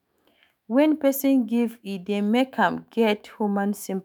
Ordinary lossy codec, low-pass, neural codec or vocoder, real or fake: none; none; autoencoder, 48 kHz, 128 numbers a frame, DAC-VAE, trained on Japanese speech; fake